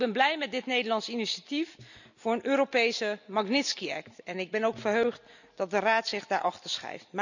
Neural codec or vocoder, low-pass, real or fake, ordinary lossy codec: none; 7.2 kHz; real; none